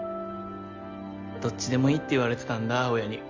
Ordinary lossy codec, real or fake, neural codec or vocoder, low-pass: Opus, 32 kbps; real; none; 7.2 kHz